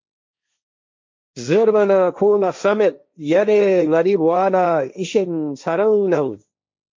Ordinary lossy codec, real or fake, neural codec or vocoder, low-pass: MP3, 48 kbps; fake; codec, 16 kHz, 1.1 kbps, Voila-Tokenizer; 7.2 kHz